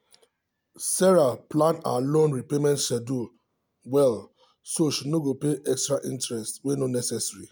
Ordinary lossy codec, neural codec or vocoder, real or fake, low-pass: none; none; real; none